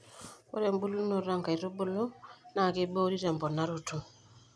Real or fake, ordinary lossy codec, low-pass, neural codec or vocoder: real; none; none; none